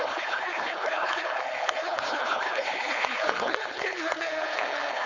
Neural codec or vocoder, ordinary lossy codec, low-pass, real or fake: codec, 16 kHz, 4.8 kbps, FACodec; MP3, 64 kbps; 7.2 kHz; fake